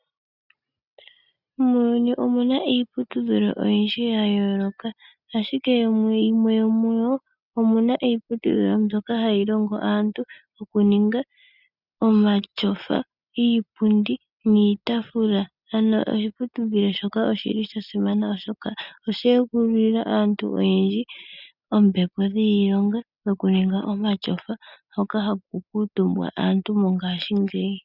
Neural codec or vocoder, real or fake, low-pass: none; real; 5.4 kHz